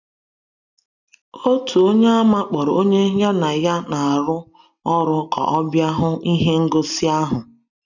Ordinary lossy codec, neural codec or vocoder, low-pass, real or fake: none; none; 7.2 kHz; real